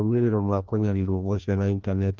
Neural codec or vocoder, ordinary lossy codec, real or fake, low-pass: codec, 16 kHz, 1 kbps, FreqCodec, larger model; Opus, 32 kbps; fake; 7.2 kHz